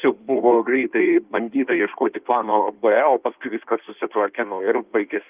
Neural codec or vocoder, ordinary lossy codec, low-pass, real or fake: codec, 16 kHz in and 24 kHz out, 1.1 kbps, FireRedTTS-2 codec; Opus, 32 kbps; 3.6 kHz; fake